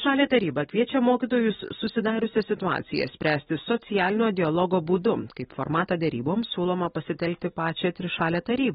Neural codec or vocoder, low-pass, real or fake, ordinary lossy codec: none; 19.8 kHz; real; AAC, 16 kbps